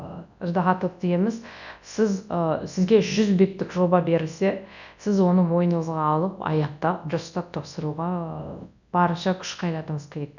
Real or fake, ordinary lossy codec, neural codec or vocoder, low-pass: fake; none; codec, 24 kHz, 0.9 kbps, WavTokenizer, large speech release; 7.2 kHz